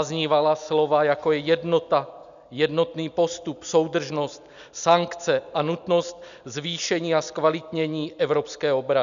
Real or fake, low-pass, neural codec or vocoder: real; 7.2 kHz; none